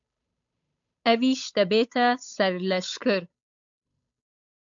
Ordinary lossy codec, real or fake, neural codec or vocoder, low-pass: MP3, 64 kbps; fake; codec, 16 kHz, 8 kbps, FunCodec, trained on Chinese and English, 25 frames a second; 7.2 kHz